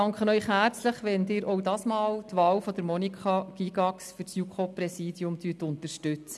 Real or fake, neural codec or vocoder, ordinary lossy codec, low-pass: real; none; none; none